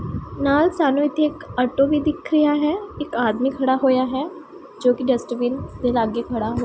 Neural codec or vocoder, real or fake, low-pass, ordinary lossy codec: none; real; none; none